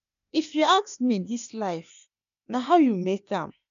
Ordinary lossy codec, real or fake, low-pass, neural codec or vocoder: none; fake; 7.2 kHz; codec, 16 kHz, 0.8 kbps, ZipCodec